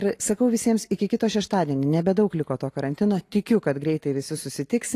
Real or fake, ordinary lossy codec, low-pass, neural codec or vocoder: real; AAC, 48 kbps; 14.4 kHz; none